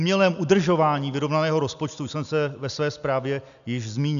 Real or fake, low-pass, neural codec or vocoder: real; 7.2 kHz; none